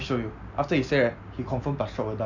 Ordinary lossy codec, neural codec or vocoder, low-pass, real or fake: none; none; 7.2 kHz; real